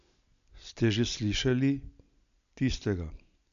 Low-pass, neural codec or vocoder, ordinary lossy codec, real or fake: 7.2 kHz; none; AAC, 96 kbps; real